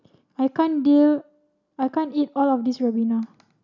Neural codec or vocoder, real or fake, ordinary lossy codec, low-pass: none; real; none; 7.2 kHz